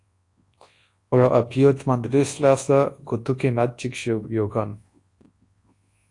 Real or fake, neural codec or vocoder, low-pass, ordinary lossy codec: fake; codec, 24 kHz, 0.9 kbps, WavTokenizer, large speech release; 10.8 kHz; AAC, 48 kbps